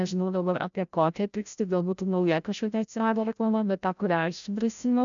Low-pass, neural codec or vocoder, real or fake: 7.2 kHz; codec, 16 kHz, 0.5 kbps, FreqCodec, larger model; fake